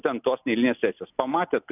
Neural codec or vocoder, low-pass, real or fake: none; 3.6 kHz; real